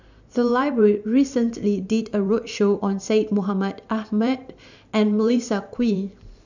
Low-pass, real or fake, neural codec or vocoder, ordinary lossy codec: 7.2 kHz; fake; vocoder, 44.1 kHz, 128 mel bands every 512 samples, BigVGAN v2; none